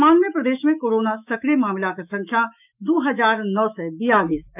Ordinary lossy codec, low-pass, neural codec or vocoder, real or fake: none; 3.6 kHz; autoencoder, 48 kHz, 128 numbers a frame, DAC-VAE, trained on Japanese speech; fake